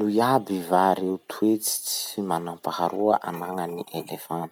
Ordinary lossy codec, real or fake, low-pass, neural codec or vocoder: none; real; 19.8 kHz; none